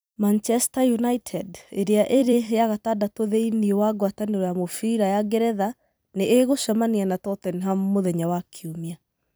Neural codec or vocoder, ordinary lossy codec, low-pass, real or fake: vocoder, 44.1 kHz, 128 mel bands every 256 samples, BigVGAN v2; none; none; fake